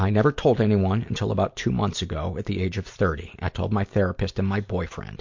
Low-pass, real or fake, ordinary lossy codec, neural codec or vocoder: 7.2 kHz; real; MP3, 48 kbps; none